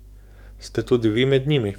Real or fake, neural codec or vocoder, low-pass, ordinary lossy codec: fake; codec, 44.1 kHz, 7.8 kbps, DAC; 19.8 kHz; none